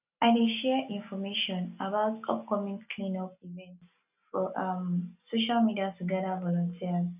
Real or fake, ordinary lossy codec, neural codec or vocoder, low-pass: real; none; none; 3.6 kHz